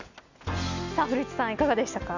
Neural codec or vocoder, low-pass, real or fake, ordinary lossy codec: none; 7.2 kHz; real; none